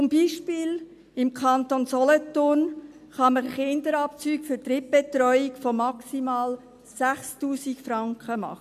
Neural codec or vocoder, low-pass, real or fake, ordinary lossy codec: none; 14.4 kHz; real; MP3, 96 kbps